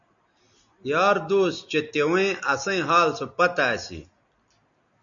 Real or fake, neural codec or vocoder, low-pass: real; none; 7.2 kHz